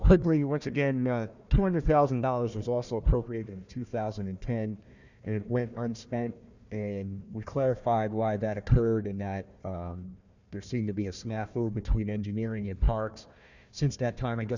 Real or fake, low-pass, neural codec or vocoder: fake; 7.2 kHz; codec, 16 kHz, 1 kbps, FunCodec, trained on Chinese and English, 50 frames a second